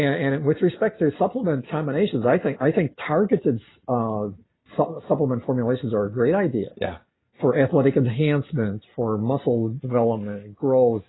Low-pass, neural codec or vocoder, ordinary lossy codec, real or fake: 7.2 kHz; none; AAC, 16 kbps; real